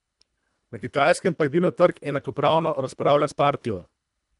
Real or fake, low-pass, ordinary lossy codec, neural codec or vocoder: fake; 10.8 kHz; MP3, 96 kbps; codec, 24 kHz, 1.5 kbps, HILCodec